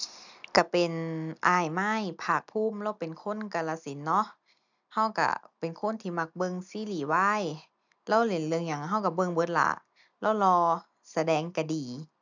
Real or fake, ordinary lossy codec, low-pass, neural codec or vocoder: real; none; 7.2 kHz; none